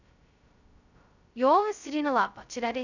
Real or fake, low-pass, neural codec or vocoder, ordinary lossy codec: fake; 7.2 kHz; codec, 16 kHz, 0.2 kbps, FocalCodec; none